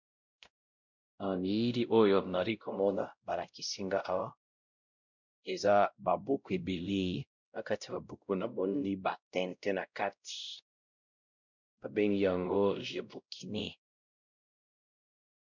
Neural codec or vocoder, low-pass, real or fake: codec, 16 kHz, 0.5 kbps, X-Codec, WavLM features, trained on Multilingual LibriSpeech; 7.2 kHz; fake